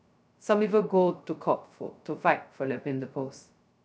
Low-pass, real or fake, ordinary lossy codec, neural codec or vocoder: none; fake; none; codec, 16 kHz, 0.2 kbps, FocalCodec